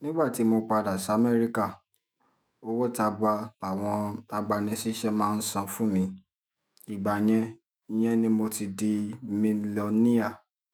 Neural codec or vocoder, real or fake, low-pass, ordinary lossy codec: autoencoder, 48 kHz, 128 numbers a frame, DAC-VAE, trained on Japanese speech; fake; none; none